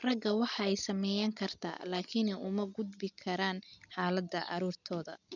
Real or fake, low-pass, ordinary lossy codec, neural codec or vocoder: real; 7.2 kHz; none; none